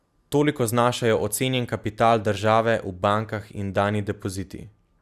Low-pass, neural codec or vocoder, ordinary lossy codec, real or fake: 14.4 kHz; none; Opus, 64 kbps; real